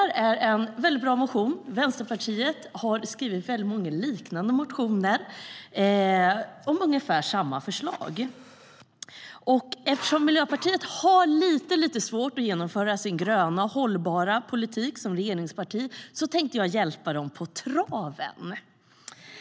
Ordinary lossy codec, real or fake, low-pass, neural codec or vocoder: none; real; none; none